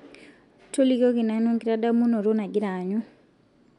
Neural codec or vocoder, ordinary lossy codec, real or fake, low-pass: none; none; real; 10.8 kHz